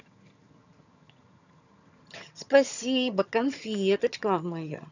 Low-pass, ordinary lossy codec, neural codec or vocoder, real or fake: 7.2 kHz; none; vocoder, 22.05 kHz, 80 mel bands, HiFi-GAN; fake